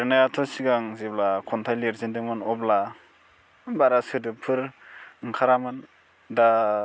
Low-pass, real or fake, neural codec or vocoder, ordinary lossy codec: none; real; none; none